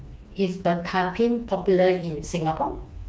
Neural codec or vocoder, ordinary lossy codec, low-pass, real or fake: codec, 16 kHz, 2 kbps, FreqCodec, smaller model; none; none; fake